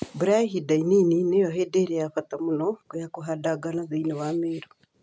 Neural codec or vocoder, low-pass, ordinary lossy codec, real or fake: none; none; none; real